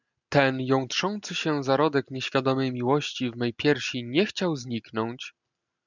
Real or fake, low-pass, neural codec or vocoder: real; 7.2 kHz; none